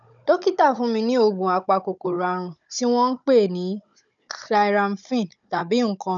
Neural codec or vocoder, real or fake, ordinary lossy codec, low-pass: codec, 16 kHz, 16 kbps, FunCodec, trained on Chinese and English, 50 frames a second; fake; none; 7.2 kHz